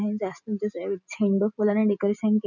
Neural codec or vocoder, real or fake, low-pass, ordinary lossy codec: none; real; 7.2 kHz; none